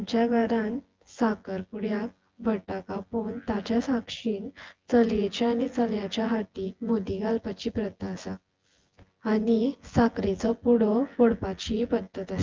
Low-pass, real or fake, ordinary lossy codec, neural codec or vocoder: 7.2 kHz; fake; Opus, 32 kbps; vocoder, 24 kHz, 100 mel bands, Vocos